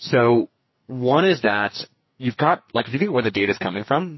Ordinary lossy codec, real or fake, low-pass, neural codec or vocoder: MP3, 24 kbps; fake; 7.2 kHz; codec, 44.1 kHz, 2.6 kbps, SNAC